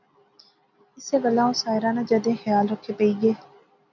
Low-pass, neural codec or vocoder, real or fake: 7.2 kHz; none; real